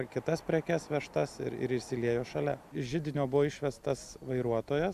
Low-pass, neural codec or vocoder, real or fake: 14.4 kHz; none; real